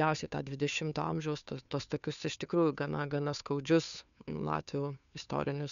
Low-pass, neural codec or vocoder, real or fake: 7.2 kHz; codec, 16 kHz, 2 kbps, FunCodec, trained on Chinese and English, 25 frames a second; fake